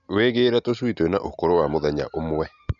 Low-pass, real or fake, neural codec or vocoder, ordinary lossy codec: 7.2 kHz; real; none; none